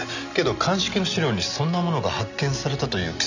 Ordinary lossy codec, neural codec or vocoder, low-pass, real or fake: none; none; 7.2 kHz; real